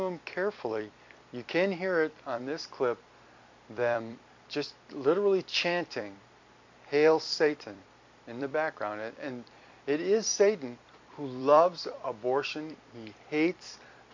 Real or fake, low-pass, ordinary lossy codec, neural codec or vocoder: fake; 7.2 kHz; MP3, 64 kbps; vocoder, 44.1 kHz, 128 mel bands every 256 samples, BigVGAN v2